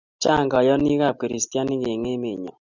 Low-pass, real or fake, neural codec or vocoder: 7.2 kHz; real; none